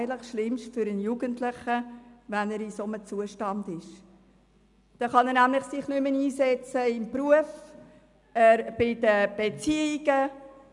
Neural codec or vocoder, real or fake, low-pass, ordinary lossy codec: none; real; 10.8 kHz; none